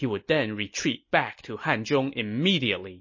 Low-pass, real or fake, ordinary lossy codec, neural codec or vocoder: 7.2 kHz; real; MP3, 32 kbps; none